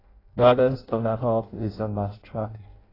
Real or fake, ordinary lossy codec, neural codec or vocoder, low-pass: fake; AAC, 24 kbps; codec, 16 kHz in and 24 kHz out, 0.6 kbps, FireRedTTS-2 codec; 5.4 kHz